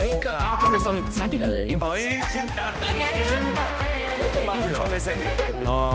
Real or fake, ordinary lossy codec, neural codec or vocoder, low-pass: fake; none; codec, 16 kHz, 1 kbps, X-Codec, HuBERT features, trained on balanced general audio; none